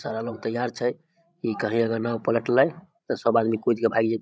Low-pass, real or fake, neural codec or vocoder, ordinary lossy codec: none; fake; codec, 16 kHz, 16 kbps, FreqCodec, larger model; none